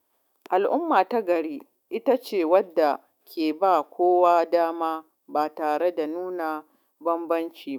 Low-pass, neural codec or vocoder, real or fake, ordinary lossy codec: 19.8 kHz; autoencoder, 48 kHz, 128 numbers a frame, DAC-VAE, trained on Japanese speech; fake; none